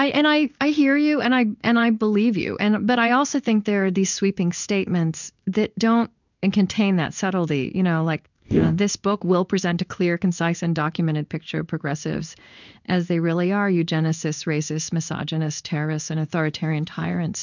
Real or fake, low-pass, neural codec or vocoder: fake; 7.2 kHz; codec, 16 kHz in and 24 kHz out, 1 kbps, XY-Tokenizer